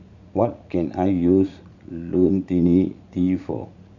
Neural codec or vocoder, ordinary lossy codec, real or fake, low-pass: vocoder, 44.1 kHz, 80 mel bands, Vocos; none; fake; 7.2 kHz